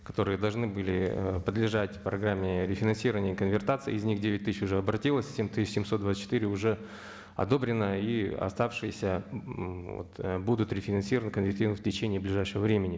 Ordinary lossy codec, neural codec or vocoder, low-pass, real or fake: none; none; none; real